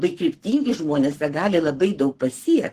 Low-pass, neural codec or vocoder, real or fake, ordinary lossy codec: 14.4 kHz; codec, 44.1 kHz, 7.8 kbps, Pupu-Codec; fake; Opus, 16 kbps